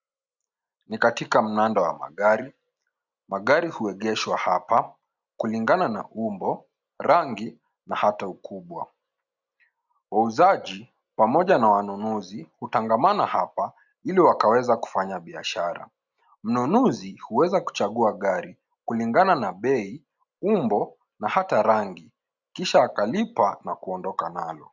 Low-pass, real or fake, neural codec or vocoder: 7.2 kHz; real; none